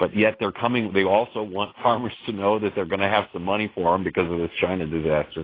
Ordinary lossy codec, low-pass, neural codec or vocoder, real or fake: AAC, 24 kbps; 5.4 kHz; none; real